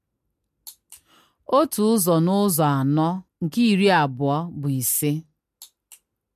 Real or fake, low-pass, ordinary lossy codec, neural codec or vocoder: real; 14.4 kHz; MP3, 64 kbps; none